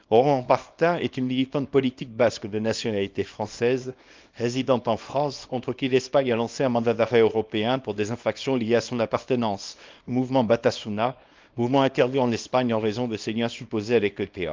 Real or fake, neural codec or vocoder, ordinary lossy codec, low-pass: fake; codec, 24 kHz, 0.9 kbps, WavTokenizer, small release; Opus, 32 kbps; 7.2 kHz